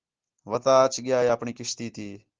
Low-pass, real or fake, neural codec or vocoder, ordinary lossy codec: 7.2 kHz; real; none; Opus, 16 kbps